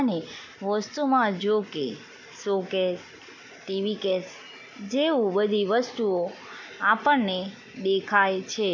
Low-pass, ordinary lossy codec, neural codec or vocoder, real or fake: 7.2 kHz; none; none; real